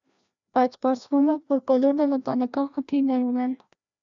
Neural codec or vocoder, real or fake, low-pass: codec, 16 kHz, 1 kbps, FreqCodec, larger model; fake; 7.2 kHz